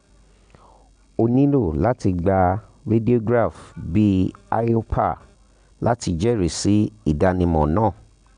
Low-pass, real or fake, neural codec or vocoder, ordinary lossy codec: 9.9 kHz; real; none; none